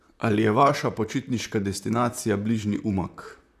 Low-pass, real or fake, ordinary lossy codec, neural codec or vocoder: 14.4 kHz; fake; none; vocoder, 44.1 kHz, 128 mel bands, Pupu-Vocoder